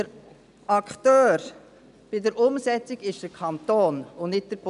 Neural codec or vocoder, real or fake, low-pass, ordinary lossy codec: none; real; 10.8 kHz; none